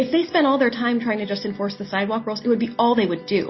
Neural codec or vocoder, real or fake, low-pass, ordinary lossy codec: none; real; 7.2 kHz; MP3, 24 kbps